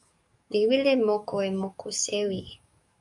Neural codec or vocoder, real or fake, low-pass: codec, 44.1 kHz, 7.8 kbps, DAC; fake; 10.8 kHz